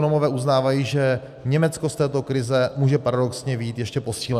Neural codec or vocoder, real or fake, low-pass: none; real; 14.4 kHz